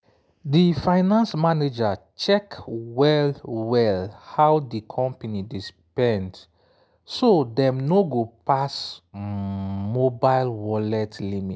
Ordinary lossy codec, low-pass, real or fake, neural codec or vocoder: none; none; real; none